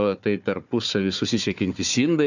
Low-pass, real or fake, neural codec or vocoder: 7.2 kHz; fake; codec, 16 kHz, 4 kbps, FunCodec, trained on Chinese and English, 50 frames a second